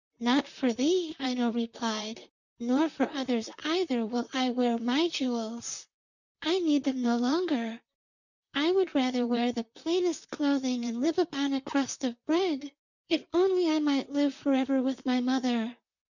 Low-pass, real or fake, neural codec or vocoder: 7.2 kHz; fake; vocoder, 44.1 kHz, 128 mel bands, Pupu-Vocoder